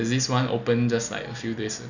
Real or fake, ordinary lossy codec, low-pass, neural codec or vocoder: real; none; 7.2 kHz; none